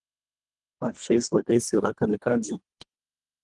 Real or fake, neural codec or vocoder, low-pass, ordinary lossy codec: fake; codec, 24 kHz, 1.5 kbps, HILCodec; 10.8 kHz; Opus, 24 kbps